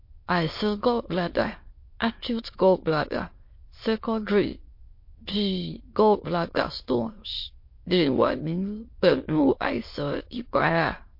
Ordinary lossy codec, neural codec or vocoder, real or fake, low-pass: MP3, 32 kbps; autoencoder, 22.05 kHz, a latent of 192 numbers a frame, VITS, trained on many speakers; fake; 5.4 kHz